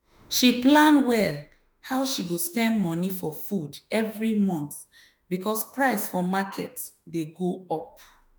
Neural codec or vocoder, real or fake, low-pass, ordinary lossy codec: autoencoder, 48 kHz, 32 numbers a frame, DAC-VAE, trained on Japanese speech; fake; none; none